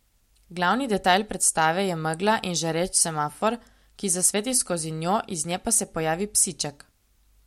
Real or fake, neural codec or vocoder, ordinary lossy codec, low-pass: real; none; MP3, 64 kbps; 19.8 kHz